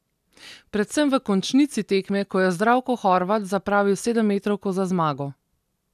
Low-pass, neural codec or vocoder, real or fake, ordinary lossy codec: 14.4 kHz; none; real; none